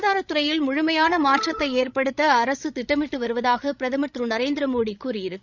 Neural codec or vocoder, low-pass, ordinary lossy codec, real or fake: codec, 16 kHz, 16 kbps, FreqCodec, larger model; 7.2 kHz; none; fake